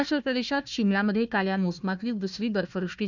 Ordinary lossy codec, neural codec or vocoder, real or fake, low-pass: none; codec, 16 kHz, 1 kbps, FunCodec, trained on Chinese and English, 50 frames a second; fake; 7.2 kHz